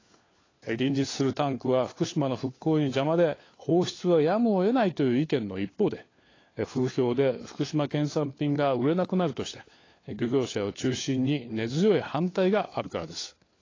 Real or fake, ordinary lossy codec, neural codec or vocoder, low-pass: fake; AAC, 32 kbps; codec, 16 kHz, 4 kbps, FunCodec, trained on LibriTTS, 50 frames a second; 7.2 kHz